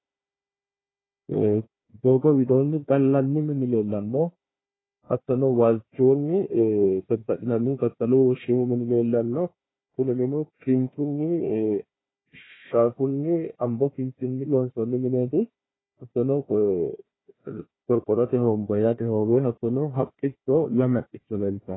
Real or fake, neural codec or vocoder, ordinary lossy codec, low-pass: fake; codec, 16 kHz, 1 kbps, FunCodec, trained on Chinese and English, 50 frames a second; AAC, 16 kbps; 7.2 kHz